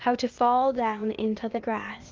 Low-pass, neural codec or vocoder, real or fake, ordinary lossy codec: 7.2 kHz; codec, 16 kHz, 0.8 kbps, ZipCodec; fake; Opus, 32 kbps